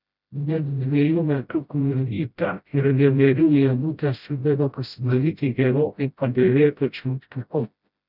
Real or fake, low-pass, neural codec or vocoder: fake; 5.4 kHz; codec, 16 kHz, 0.5 kbps, FreqCodec, smaller model